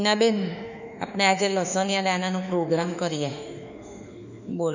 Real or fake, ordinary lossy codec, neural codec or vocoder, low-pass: fake; none; autoencoder, 48 kHz, 32 numbers a frame, DAC-VAE, trained on Japanese speech; 7.2 kHz